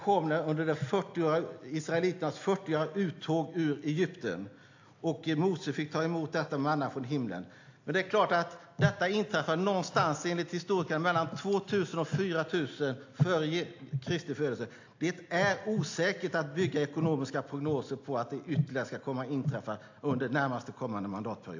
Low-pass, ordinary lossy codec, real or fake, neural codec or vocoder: 7.2 kHz; AAC, 48 kbps; real; none